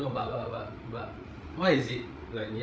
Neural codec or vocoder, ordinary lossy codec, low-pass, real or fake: codec, 16 kHz, 8 kbps, FreqCodec, larger model; none; none; fake